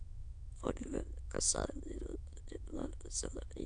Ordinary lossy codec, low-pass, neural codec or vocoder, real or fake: AAC, 64 kbps; 9.9 kHz; autoencoder, 22.05 kHz, a latent of 192 numbers a frame, VITS, trained on many speakers; fake